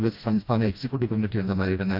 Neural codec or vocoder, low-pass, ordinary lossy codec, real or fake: codec, 16 kHz, 1 kbps, FreqCodec, smaller model; 5.4 kHz; AAC, 48 kbps; fake